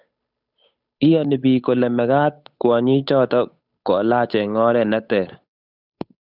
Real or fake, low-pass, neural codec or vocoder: fake; 5.4 kHz; codec, 16 kHz, 8 kbps, FunCodec, trained on Chinese and English, 25 frames a second